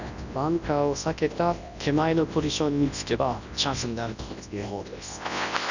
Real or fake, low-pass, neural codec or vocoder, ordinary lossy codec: fake; 7.2 kHz; codec, 24 kHz, 0.9 kbps, WavTokenizer, large speech release; none